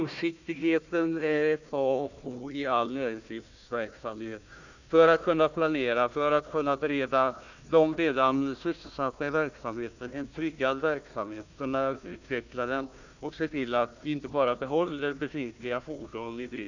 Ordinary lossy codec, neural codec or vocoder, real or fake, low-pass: none; codec, 16 kHz, 1 kbps, FunCodec, trained on Chinese and English, 50 frames a second; fake; 7.2 kHz